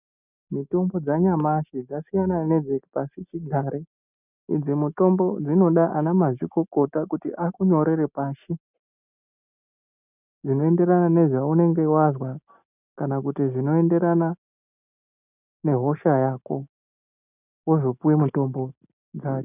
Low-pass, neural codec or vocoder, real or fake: 3.6 kHz; none; real